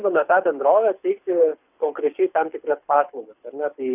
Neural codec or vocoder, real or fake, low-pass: codec, 24 kHz, 6 kbps, HILCodec; fake; 3.6 kHz